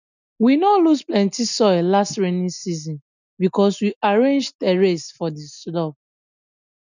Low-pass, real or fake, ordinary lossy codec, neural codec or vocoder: 7.2 kHz; real; none; none